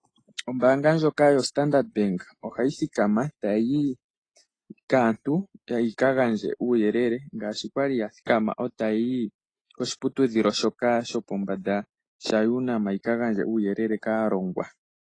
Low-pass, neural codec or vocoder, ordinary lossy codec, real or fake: 9.9 kHz; none; AAC, 32 kbps; real